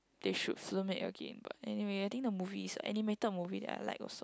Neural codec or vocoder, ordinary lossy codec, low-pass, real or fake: none; none; none; real